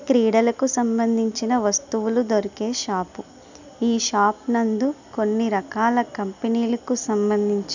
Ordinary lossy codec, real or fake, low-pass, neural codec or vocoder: none; real; 7.2 kHz; none